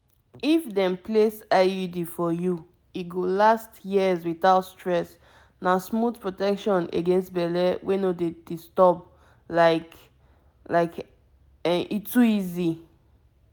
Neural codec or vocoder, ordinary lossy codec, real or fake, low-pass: none; none; real; none